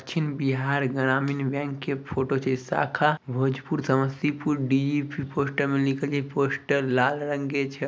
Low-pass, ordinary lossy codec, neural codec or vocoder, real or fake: none; none; none; real